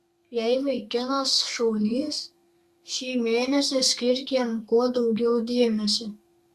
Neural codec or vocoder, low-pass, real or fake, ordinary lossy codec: codec, 32 kHz, 1.9 kbps, SNAC; 14.4 kHz; fake; Opus, 64 kbps